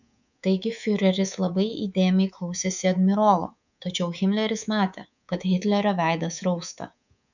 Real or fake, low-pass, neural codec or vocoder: fake; 7.2 kHz; codec, 24 kHz, 3.1 kbps, DualCodec